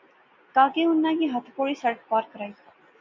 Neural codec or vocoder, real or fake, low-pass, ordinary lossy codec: none; real; 7.2 kHz; MP3, 48 kbps